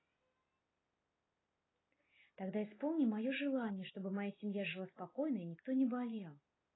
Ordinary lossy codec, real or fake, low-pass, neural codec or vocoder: AAC, 16 kbps; real; 7.2 kHz; none